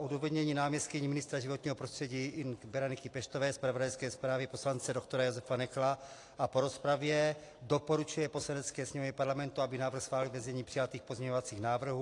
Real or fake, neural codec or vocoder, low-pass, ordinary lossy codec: real; none; 10.8 kHz; AAC, 48 kbps